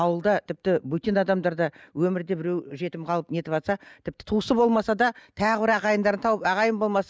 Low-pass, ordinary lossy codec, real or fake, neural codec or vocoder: none; none; real; none